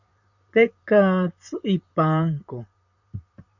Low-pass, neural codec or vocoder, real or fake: 7.2 kHz; vocoder, 44.1 kHz, 128 mel bands, Pupu-Vocoder; fake